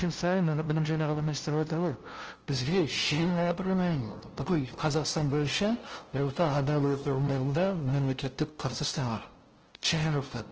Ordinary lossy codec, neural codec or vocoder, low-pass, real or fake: Opus, 16 kbps; codec, 16 kHz, 0.5 kbps, FunCodec, trained on LibriTTS, 25 frames a second; 7.2 kHz; fake